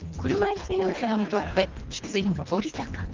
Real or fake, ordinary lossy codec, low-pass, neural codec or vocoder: fake; Opus, 24 kbps; 7.2 kHz; codec, 24 kHz, 1.5 kbps, HILCodec